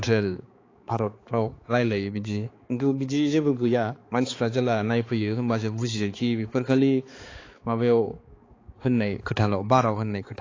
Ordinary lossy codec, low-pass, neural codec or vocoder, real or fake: AAC, 32 kbps; 7.2 kHz; codec, 16 kHz, 4 kbps, X-Codec, HuBERT features, trained on balanced general audio; fake